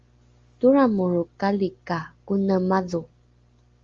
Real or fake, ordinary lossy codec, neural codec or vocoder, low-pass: real; Opus, 32 kbps; none; 7.2 kHz